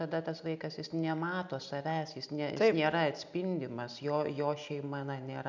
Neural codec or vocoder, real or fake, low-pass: none; real; 7.2 kHz